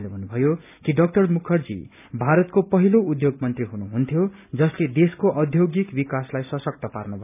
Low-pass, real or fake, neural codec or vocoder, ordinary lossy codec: 3.6 kHz; real; none; none